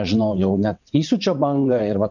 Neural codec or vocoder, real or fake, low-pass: vocoder, 22.05 kHz, 80 mel bands, WaveNeXt; fake; 7.2 kHz